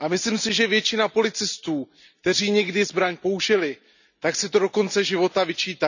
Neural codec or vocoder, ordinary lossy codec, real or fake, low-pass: none; none; real; 7.2 kHz